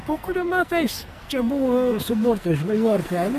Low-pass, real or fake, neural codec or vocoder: 14.4 kHz; fake; codec, 44.1 kHz, 2.6 kbps, SNAC